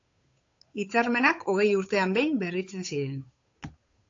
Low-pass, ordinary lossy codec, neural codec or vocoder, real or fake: 7.2 kHz; AAC, 48 kbps; codec, 16 kHz, 8 kbps, FunCodec, trained on Chinese and English, 25 frames a second; fake